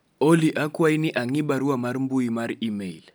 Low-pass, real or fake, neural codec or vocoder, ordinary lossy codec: none; real; none; none